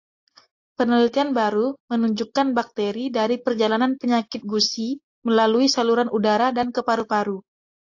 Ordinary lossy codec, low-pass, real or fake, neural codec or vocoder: AAC, 48 kbps; 7.2 kHz; real; none